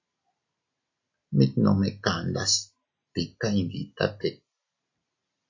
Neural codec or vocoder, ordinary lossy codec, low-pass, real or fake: none; AAC, 48 kbps; 7.2 kHz; real